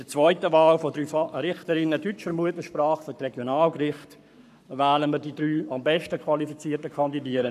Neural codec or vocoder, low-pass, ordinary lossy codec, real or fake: codec, 44.1 kHz, 7.8 kbps, Pupu-Codec; 14.4 kHz; none; fake